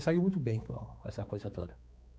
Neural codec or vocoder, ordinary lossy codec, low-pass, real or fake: codec, 16 kHz, 4 kbps, X-Codec, HuBERT features, trained on balanced general audio; none; none; fake